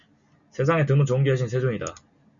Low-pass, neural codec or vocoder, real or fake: 7.2 kHz; none; real